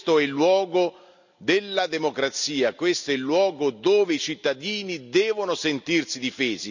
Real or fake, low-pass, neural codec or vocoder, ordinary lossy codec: real; 7.2 kHz; none; none